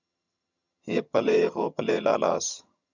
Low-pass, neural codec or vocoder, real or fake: 7.2 kHz; vocoder, 22.05 kHz, 80 mel bands, HiFi-GAN; fake